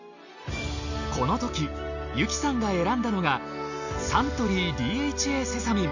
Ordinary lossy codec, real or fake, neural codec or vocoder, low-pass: none; real; none; 7.2 kHz